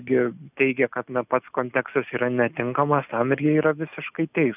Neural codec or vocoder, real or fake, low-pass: none; real; 3.6 kHz